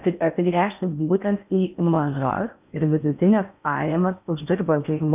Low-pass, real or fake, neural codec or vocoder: 3.6 kHz; fake; codec, 16 kHz in and 24 kHz out, 0.6 kbps, FocalCodec, streaming, 4096 codes